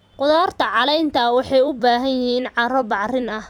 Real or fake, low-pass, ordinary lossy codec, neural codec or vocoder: fake; 19.8 kHz; none; vocoder, 44.1 kHz, 128 mel bands every 256 samples, BigVGAN v2